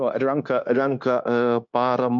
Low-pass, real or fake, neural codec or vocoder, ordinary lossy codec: 7.2 kHz; fake; codec, 16 kHz, 0.9 kbps, LongCat-Audio-Codec; MP3, 48 kbps